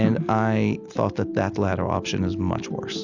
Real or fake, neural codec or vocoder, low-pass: real; none; 7.2 kHz